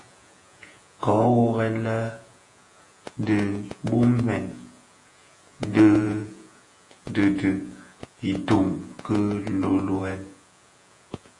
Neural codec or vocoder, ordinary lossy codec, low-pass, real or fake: vocoder, 48 kHz, 128 mel bands, Vocos; AAC, 48 kbps; 10.8 kHz; fake